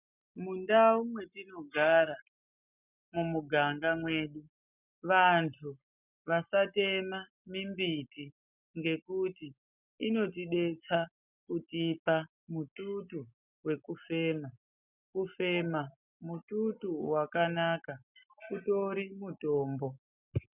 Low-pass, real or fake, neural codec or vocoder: 3.6 kHz; real; none